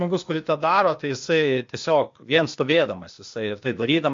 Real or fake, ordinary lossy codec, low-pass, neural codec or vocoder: fake; MP3, 48 kbps; 7.2 kHz; codec, 16 kHz, 0.8 kbps, ZipCodec